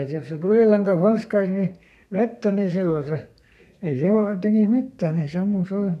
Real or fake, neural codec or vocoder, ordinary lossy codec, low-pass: fake; codec, 32 kHz, 1.9 kbps, SNAC; none; 14.4 kHz